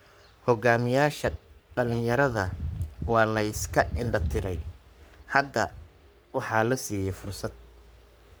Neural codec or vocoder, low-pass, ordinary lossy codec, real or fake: codec, 44.1 kHz, 3.4 kbps, Pupu-Codec; none; none; fake